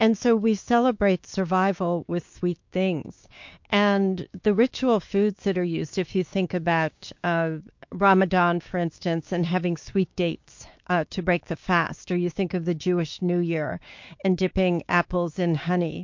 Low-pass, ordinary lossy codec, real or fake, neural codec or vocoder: 7.2 kHz; MP3, 48 kbps; fake; codec, 16 kHz, 6 kbps, DAC